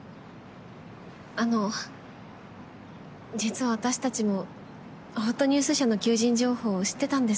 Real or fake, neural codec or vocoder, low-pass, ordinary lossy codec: real; none; none; none